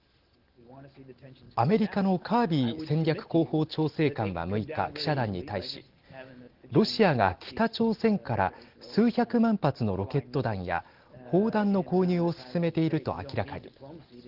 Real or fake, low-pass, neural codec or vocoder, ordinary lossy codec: real; 5.4 kHz; none; Opus, 16 kbps